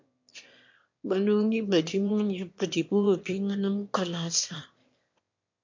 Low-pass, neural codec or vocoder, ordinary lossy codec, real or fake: 7.2 kHz; autoencoder, 22.05 kHz, a latent of 192 numbers a frame, VITS, trained on one speaker; MP3, 48 kbps; fake